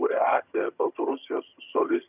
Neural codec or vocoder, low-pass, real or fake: vocoder, 22.05 kHz, 80 mel bands, HiFi-GAN; 3.6 kHz; fake